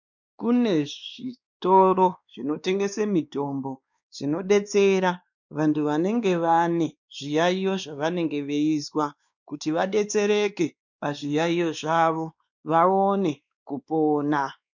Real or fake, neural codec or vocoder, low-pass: fake; codec, 16 kHz, 2 kbps, X-Codec, WavLM features, trained on Multilingual LibriSpeech; 7.2 kHz